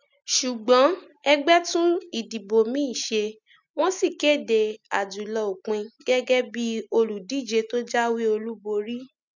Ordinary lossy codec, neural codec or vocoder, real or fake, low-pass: none; none; real; 7.2 kHz